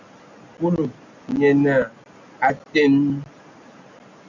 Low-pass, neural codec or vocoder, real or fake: 7.2 kHz; none; real